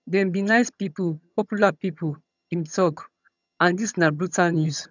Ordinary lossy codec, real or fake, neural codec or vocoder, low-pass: none; fake; vocoder, 22.05 kHz, 80 mel bands, HiFi-GAN; 7.2 kHz